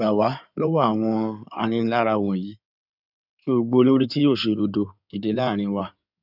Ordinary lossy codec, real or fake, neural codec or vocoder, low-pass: none; fake; codec, 16 kHz in and 24 kHz out, 2.2 kbps, FireRedTTS-2 codec; 5.4 kHz